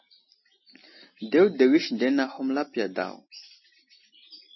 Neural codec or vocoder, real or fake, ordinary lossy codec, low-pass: none; real; MP3, 24 kbps; 7.2 kHz